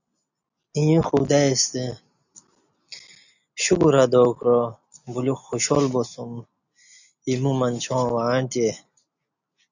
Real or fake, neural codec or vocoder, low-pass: real; none; 7.2 kHz